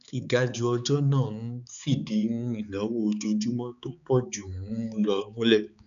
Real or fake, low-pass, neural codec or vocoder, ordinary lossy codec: fake; 7.2 kHz; codec, 16 kHz, 4 kbps, X-Codec, HuBERT features, trained on balanced general audio; none